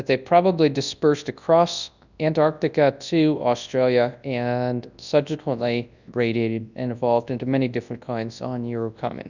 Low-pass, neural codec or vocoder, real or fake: 7.2 kHz; codec, 24 kHz, 0.9 kbps, WavTokenizer, large speech release; fake